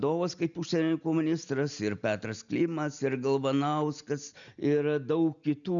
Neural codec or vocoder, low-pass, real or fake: none; 7.2 kHz; real